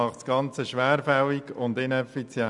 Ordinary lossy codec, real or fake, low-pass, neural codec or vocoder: none; real; 10.8 kHz; none